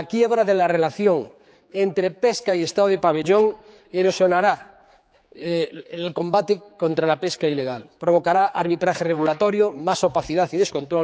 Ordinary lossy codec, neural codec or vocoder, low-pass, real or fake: none; codec, 16 kHz, 4 kbps, X-Codec, HuBERT features, trained on general audio; none; fake